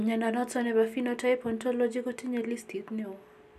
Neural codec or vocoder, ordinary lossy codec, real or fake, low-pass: none; none; real; 14.4 kHz